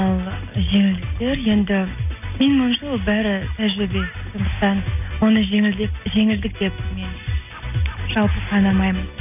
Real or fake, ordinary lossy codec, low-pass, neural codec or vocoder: real; none; 3.6 kHz; none